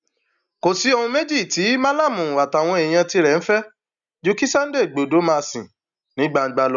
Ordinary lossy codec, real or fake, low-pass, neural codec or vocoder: none; real; 7.2 kHz; none